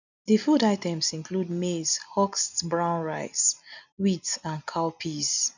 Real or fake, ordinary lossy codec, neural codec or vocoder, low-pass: real; none; none; 7.2 kHz